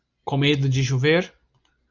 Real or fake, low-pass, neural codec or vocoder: real; 7.2 kHz; none